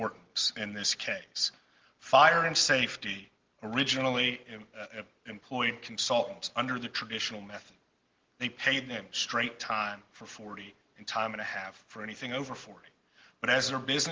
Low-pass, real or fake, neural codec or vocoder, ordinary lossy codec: 7.2 kHz; real; none; Opus, 16 kbps